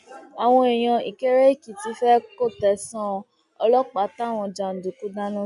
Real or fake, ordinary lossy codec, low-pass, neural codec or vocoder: real; none; 10.8 kHz; none